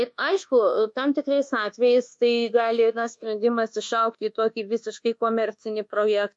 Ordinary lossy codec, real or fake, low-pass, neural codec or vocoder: MP3, 48 kbps; fake; 10.8 kHz; codec, 24 kHz, 1.2 kbps, DualCodec